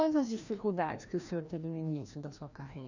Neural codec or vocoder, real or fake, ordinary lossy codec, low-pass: codec, 16 kHz, 1 kbps, FreqCodec, larger model; fake; none; 7.2 kHz